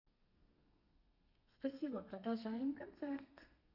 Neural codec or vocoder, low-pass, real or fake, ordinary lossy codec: codec, 32 kHz, 1.9 kbps, SNAC; 5.4 kHz; fake; none